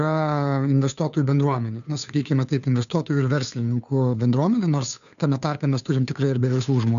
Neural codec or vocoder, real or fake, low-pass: codec, 16 kHz, 2 kbps, FunCodec, trained on Chinese and English, 25 frames a second; fake; 7.2 kHz